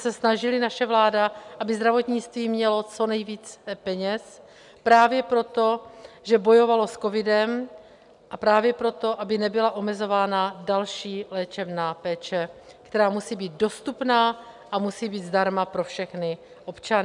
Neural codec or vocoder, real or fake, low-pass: none; real; 10.8 kHz